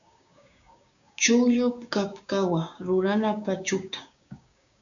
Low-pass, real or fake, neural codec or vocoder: 7.2 kHz; fake; codec, 16 kHz, 6 kbps, DAC